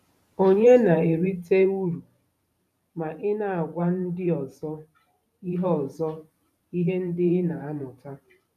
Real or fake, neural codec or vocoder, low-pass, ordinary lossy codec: fake; vocoder, 44.1 kHz, 128 mel bands every 512 samples, BigVGAN v2; 14.4 kHz; none